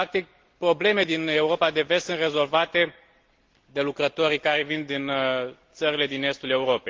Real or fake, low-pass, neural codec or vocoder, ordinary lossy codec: real; 7.2 kHz; none; Opus, 16 kbps